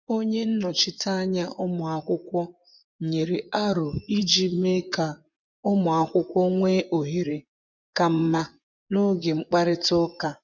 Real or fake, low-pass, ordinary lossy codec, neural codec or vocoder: fake; 7.2 kHz; none; vocoder, 24 kHz, 100 mel bands, Vocos